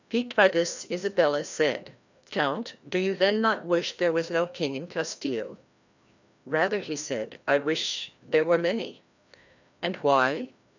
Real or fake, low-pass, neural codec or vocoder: fake; 7.2 kHz; codec, 16 kHz, 1 kbps, FreqCodec, larger model